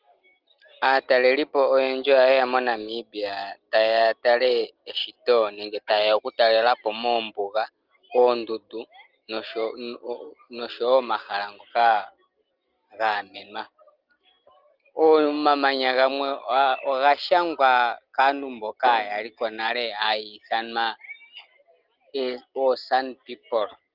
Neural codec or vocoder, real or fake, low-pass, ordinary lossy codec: none; real; 5.4 kHz; Opus, 32 kbps